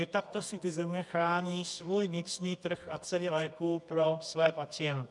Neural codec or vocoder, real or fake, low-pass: codec, 24 kHz, 0.9 kbps, WavTokenizer, medium music audio release; fake; 10.8 kHz